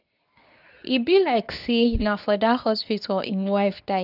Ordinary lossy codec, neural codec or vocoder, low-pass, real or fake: none; codec, 24 kHz, 0.9 kbps, WavTokenizer, medium speech release version 2; 5.4 kHz; fake